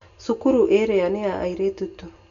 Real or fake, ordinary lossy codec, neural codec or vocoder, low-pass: real; none; none; 7.2 kHz